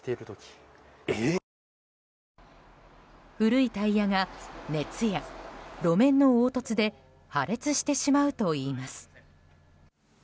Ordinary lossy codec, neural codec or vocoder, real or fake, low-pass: none; none; real; none